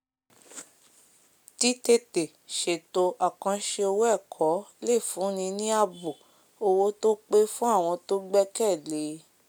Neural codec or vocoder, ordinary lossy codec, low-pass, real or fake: none; none; none; real